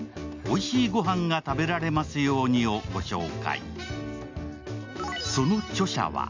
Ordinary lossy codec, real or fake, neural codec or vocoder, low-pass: MP3, 64 kbps; real; none; 7.2 kHz